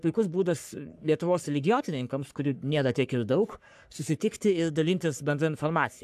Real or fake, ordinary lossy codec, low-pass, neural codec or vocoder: fake; AAC, 96 kbps; 14.4 kHz; codec, 44.1 kHz, 3.4 kbps, Pupu-Codec